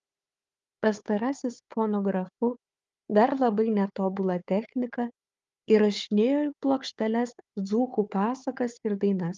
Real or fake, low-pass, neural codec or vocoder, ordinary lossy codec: fake; 7.2 kHz; codec, 16 kHz, 4 kbps, FunCodec, trained on Chinese and English, 50 frames a second; Opus, 32 kbps